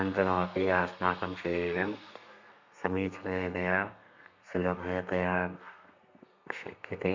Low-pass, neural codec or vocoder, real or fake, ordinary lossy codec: 7.2 kHz; codec, 32 kHz, 1.9 kbps, SNAC; fake; MP3, 64 kbps